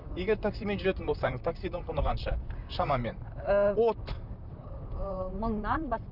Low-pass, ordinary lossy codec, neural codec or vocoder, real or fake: 5.4 kHz; none; vocoder, 44.1 kHz, 128 mel bands, Pupu-Vocoder; fake